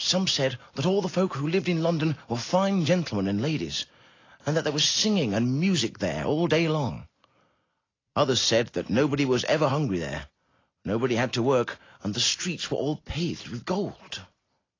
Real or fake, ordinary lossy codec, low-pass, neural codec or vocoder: real; AAC, 32 kbps; 7.2 kHz; none